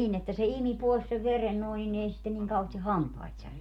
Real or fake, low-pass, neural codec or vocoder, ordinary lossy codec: real; 19.8 kHz; none; MP3, 96 kbps